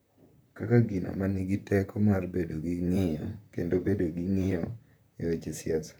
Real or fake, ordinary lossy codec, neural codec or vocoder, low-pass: fake; none; vocoder, 44.1 kHz, 128 mel bands, Pupu-Vocoder; none